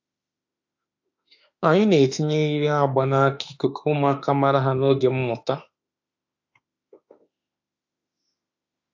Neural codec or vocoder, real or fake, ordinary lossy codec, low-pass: autoencoder, 48 kHz, 32 numbers a frame, DAC-VAE, trained on Japanese speech; fake; AAC, 48 kbps; 7.2 kHz